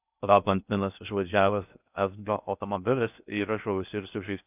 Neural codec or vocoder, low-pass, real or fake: codec, 16 kHz in and 24 kHz out, 0.6 kbps, FocalCodec, streaming, 4096 codes; 3.6 kHz; fake